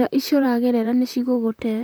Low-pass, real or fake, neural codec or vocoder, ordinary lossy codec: none; fake; vocoder, 44.1 kHz, 128 mel bands, Pupu-Vocoder; none